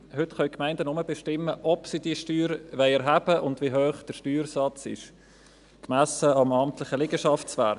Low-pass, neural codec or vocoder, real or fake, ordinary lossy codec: 10.8 kHz; none; real; none